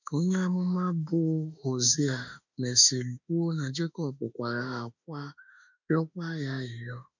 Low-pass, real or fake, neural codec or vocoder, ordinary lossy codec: 7.2 kHz; fake; autoencoder, 48 kHz, 32 numbers a frame, DAC-VAE, trained on Japanese speech; none